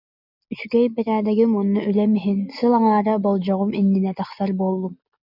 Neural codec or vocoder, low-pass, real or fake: none; 5.4 kHz; real